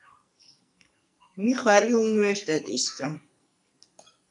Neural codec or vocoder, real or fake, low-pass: codec, 44.1 kHz, 2.6 kbps, SNAC; fake; 10.8 kHz